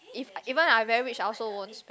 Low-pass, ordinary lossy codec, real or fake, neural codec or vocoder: none; none; real; none